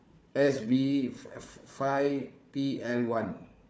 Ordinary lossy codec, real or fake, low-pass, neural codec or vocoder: none; fake; none; codec, 16 kHz, 4 kbps, FunCodec, trained on Chinese and English, 50 frames a second